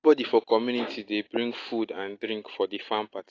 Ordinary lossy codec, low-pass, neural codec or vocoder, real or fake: AAC, 32 kbps; 7.2 kHz; none; real